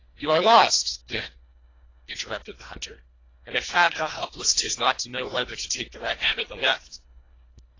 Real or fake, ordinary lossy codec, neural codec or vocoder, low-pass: fake; AAC, 32 kbps; codec, 24 kHz, 1.5 kbps, HILCodec; 7.2 kHz